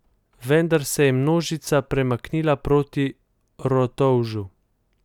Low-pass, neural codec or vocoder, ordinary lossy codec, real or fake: 19.8 kHz; none; none; real